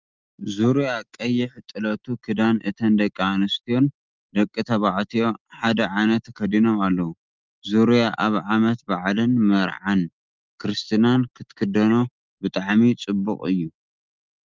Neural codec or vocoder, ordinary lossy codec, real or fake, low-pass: none; Opus, 24 kbps; real; 7.2 kHz